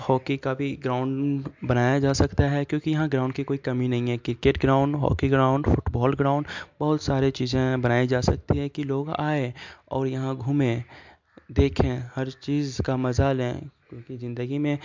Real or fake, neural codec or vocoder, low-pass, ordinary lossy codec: real; none; 7.2 kHz; MP3, 64 kbps